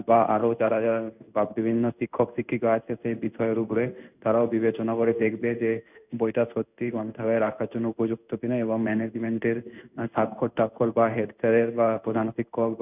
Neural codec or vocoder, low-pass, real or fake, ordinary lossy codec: codec, 16 kHz in and 24 kHz out, 1 kbps, XY-Tokenizer; 3.6 kHz; fake; none